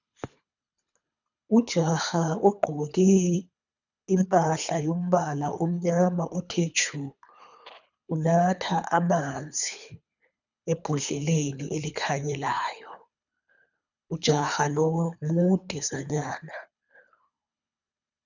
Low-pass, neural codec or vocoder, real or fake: 7.2 kHz; codec, 24 kHz, 3 kbps, HILCodec; fake